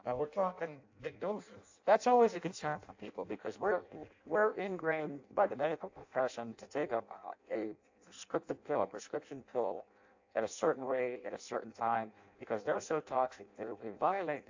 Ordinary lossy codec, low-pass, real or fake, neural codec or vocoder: AAC, 48 kbps; 7.2 kHz; fake; codec, 16 kHz in and 24 kHz out, 0.6 kbps, FireRedTTS-2 codec